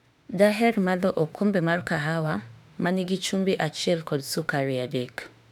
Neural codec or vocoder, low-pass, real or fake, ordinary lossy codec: autoencoder, 48 kHz, 32 numbers a frame, DAC-VAE, trained on Japanese speech; 19.8 kHz; fake; none